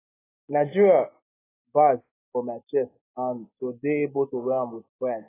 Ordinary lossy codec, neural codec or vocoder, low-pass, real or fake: AAC, 16 kbps; none; 3.6 kHz; real